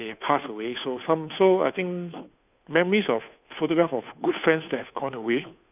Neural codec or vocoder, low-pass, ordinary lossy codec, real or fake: codec, 16 kHz, 2 kbps, FunCodec, trained on Chinese and English, 25 frames a second; 3.6 kHz; none; fake